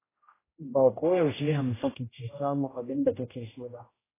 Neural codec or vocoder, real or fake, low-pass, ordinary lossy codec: codec, 16 kHz, 0.5 kbps, X-Codec, HuBERT features, trained on general audio; fake; 3.6 kHz; AAC, 16 kbps